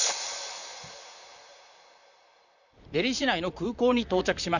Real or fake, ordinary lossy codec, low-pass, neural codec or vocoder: fake; none; 7.2 kHz; vocoder, 44.1 kHz, 128 mel bands, Pupu-Vocoder